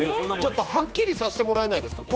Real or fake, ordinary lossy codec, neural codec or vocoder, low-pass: fake; none; codec, 16 kHz, 2 kbps, X-Codec, HuBERT features, trained on general audio; none